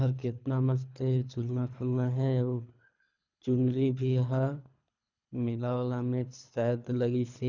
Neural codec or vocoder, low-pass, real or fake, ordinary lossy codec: codec, 24 kHz, 3 kbps, HILCodec; 7.2 kHz; fake; none